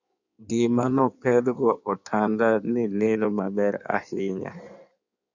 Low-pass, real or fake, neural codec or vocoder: 7.2 kHz; fake; codec, 16 kHz in and 24 kHz out, 1.1 kbps, FireRedTTS-2 codec